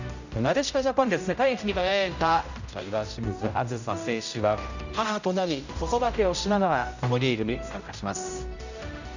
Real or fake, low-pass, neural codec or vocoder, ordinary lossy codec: fake; 7.2 kHz; codec, 16 kHz, 0.5 kbps, X-Codec, HuBERT features, trained on general audio; none